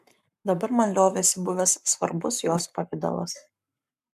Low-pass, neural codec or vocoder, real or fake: 14.4 kHz; codec, 44.1 kHz, 7.8 kbps, Pupu-Codec; fake